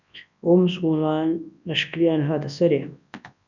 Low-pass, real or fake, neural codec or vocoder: 7.2 kHz; fake; codec, 24 kHz, 0.9 kbps, WavTokenizer, large speech release